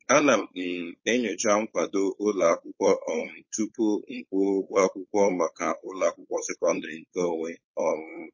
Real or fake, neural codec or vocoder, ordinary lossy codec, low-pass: fake; codec, 16 kHz, 4.8 kbps, FACodec; MP3, 32 kbps; 7.2 kHz